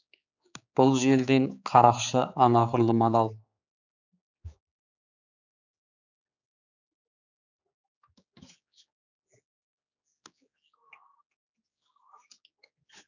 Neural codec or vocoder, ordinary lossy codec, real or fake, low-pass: codec, 16 kHz, 4 kbps, X-Codec, HuBERT features, trained on general audio; none; fake; 7.2 kHz